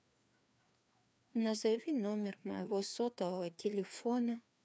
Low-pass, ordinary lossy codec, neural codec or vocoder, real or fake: none; none; codec, 16 kHz, 2 kbps, FreqCodec, larger model; fake